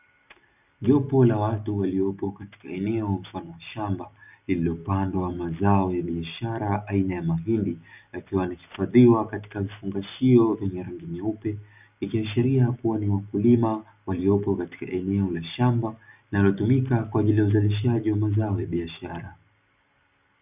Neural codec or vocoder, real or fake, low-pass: none; real; 3.6 kHz